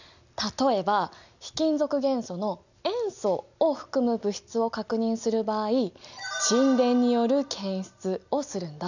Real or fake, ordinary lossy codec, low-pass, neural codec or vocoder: real; none; 7.2 kHz; none